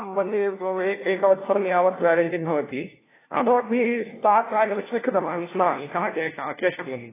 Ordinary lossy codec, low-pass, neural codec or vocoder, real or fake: AAC, 16 kbps; 3.6 kHz; codec, 16 kHz, 1 kbps, FunCodec, trained on LibriTTS, 50 frames a second; fake